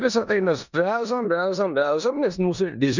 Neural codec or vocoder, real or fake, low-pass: codec, 16 kHz in and 24 kHz out, 0.9 kbps, LongCat-Audio-Codec, four codebook decoder; fake; 7.2 kHz